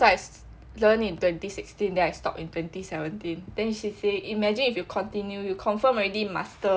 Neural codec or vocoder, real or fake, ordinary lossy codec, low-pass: none; real; none; none